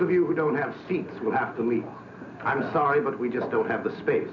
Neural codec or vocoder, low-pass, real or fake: none; 7.2 kHz; real